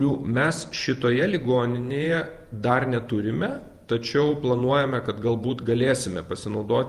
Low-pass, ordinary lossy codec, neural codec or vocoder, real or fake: 14.4 kHz; Opus, 32 kbps; vocoder, 48 kHz, 128 mel bands, Vocos; fake